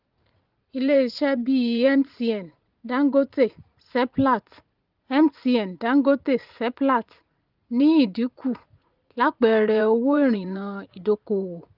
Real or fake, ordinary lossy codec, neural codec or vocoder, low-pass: fake; Opus, 32 kbps; vocoder, 44.1 kHz, 80 mel bands, Vocos; 5.4 kHz